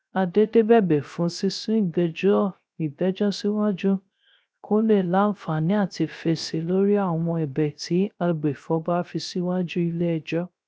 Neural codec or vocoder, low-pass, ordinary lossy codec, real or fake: codec, 16 kHz, 0.3 kbps, FocalCodec; none; none; fake